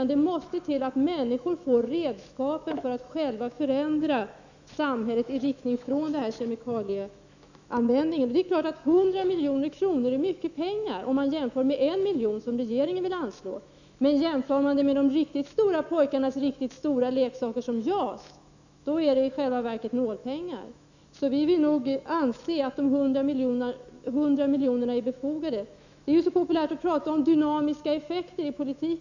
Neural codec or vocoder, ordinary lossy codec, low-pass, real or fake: autoencoder, 48 kHz, 128 numbers a frame, DAC-VAE, trained on Japanese speech; none; 7.2 kHz; fake